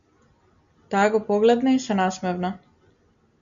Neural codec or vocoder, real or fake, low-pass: none; real; 7.2 kHz